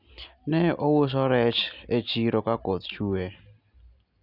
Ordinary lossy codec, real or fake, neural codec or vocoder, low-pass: none; real; none; 5.4 kHz